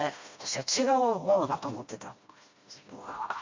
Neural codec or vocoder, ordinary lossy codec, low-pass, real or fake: codec, 16 kHz, 1 kbps, FreqCodec, smaller model; MP3, 48 kbps; 7.2 kHz; fake